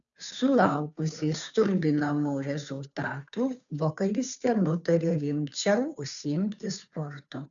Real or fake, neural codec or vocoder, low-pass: fake; codec, 16 kHz, 2 kbps, FunCodec, trained on Chinese and English, 25 frames a second; 7.2 kHz